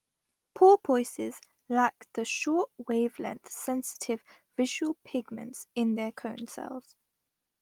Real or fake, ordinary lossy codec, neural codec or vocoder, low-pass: real; Opus, 24 kbps; none; 19.8 kHz